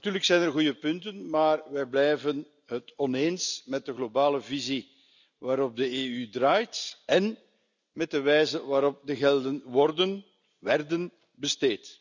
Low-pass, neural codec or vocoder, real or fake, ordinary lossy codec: 7.2 kHz; none; real; none